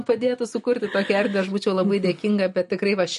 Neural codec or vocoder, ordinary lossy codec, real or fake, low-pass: none; MP3, 48 kbps; real; 14.4 kHz